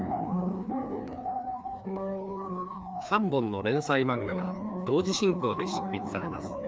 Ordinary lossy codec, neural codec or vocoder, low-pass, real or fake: none; codec, 16 kHz, 2 kbps, FreqCodec, larger model; none; fake